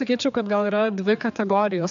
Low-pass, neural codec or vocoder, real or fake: 7.2 kHz; codec, 16 kHz, 4 kbps, X-Codec, HuBERT features, trained on general audio; fake